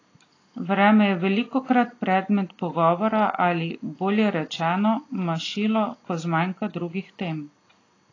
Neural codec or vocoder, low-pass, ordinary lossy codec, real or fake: none; 7.2 kHz; AAC, 32 kbps; real